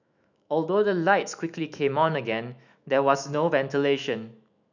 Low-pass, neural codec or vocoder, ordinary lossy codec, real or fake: 7.2 kHz; autoencoder, 48 kHz, 128 numbers a frame, DAC-VAE, trained on Japanese speech; none; fake